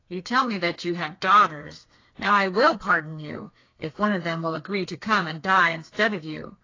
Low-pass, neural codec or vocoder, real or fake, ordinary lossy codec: 7.2 kHz; codec, 32 kHz, 1.9 kbps, SNAC; fake; AAC, 32 kbps